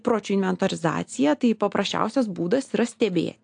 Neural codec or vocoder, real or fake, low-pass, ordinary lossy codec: none; real; 9.9 kHz; AAC, 64 kbps